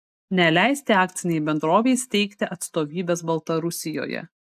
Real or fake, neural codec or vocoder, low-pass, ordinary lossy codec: real; none; 14.4 kHz; AAC, 96 kbps